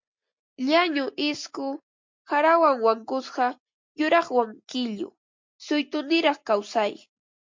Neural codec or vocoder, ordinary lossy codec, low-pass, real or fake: none; MP3, 48 kbps; 7.2 kHz; real